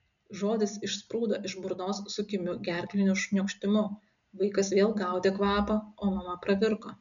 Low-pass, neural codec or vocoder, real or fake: 7.2 kHz; none; real